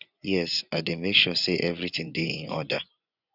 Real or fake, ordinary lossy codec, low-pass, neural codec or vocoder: fake; none; 5.4 kHz; vocoder, 24 kHz, 100 mel bands, Vocos